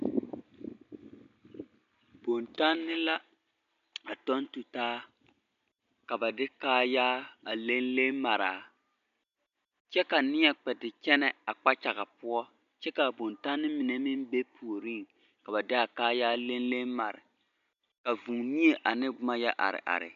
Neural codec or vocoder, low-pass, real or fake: none; 7.2 kHz; real